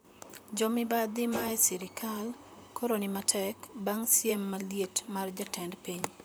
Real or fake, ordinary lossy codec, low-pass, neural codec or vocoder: fake; none; none; vocoder, 44.1 kHz, 128 mel bands, Pupu-Vocoder